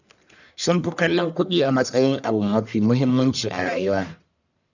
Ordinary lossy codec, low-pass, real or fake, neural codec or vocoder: none; 7.2 kHz; fake; codec, 44.1 kHz, 1.7 kbps, Pupu-Codec